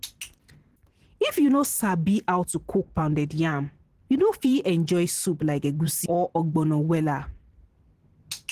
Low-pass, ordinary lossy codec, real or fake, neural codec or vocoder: 14.4 kHz; Opus, 16 kbps; fake; vocoder, 44.1 kHz, 128 mel bands every 512 samples, BigVGAN v2